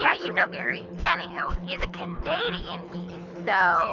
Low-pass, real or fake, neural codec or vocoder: 7.2 kHz; fake; codec, 24 kHz, 3 kbps, HILCodec